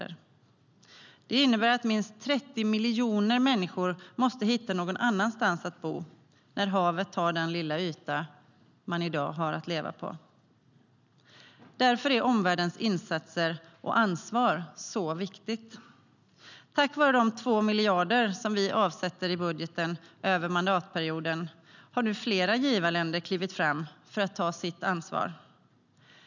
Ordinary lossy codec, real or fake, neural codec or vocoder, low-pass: none; real; none; 7.2 kHz